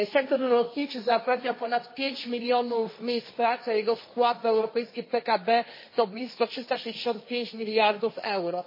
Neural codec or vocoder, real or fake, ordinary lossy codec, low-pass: codec, 16 kHz, 1.1 kbps, Voila-Tokenizer; fake; MP3, 24 kbps; 5.4 kHz